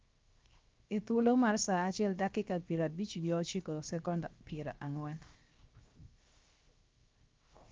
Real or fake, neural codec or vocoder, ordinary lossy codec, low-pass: fake; codec, 16 kHz, 0.7 kbps, FocalCodec; Opus, 24 kbps; 7.2 kHz